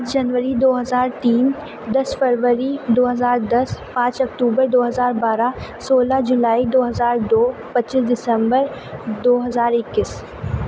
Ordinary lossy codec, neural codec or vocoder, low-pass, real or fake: none; none; none; real